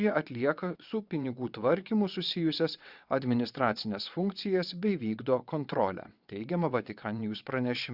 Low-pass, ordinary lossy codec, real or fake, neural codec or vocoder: 5.4 kHz; Opus, 64 kbps; fake; vocoder, 22.05 kHz, 80 mel bands, WaveNeXt